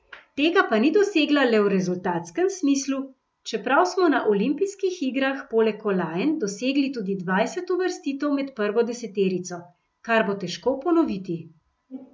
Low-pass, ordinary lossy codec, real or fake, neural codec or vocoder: none; none; real; none